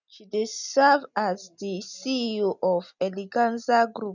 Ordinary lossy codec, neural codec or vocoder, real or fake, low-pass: none; none; real; 7.2 kHz